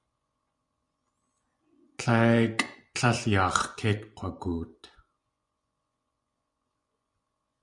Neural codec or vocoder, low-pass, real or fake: vocoder, 24 kHz, 100 mel bands, Vocos; 10.8 kHz; fake